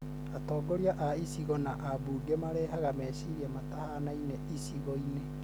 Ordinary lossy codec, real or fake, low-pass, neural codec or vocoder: none; real; none; none